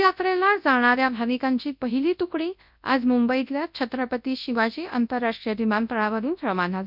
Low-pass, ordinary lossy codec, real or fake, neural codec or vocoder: 5.4 kHz; none; fake; codec, 24 kHz, 0.9 kbps, WavTokenizer, large speech release